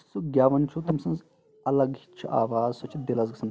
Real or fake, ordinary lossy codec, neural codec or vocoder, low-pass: real; none; none; none